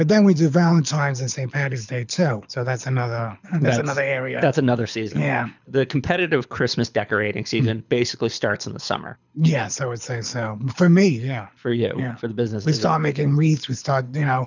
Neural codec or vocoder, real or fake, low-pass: codec, 24 kHz, 6 kbps, HILCodec; fake; 7.2 kHz